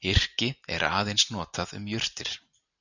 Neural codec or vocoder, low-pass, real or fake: none; 7.2 kHz; real